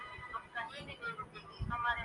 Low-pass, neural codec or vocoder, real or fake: 10.8 kHz; none; real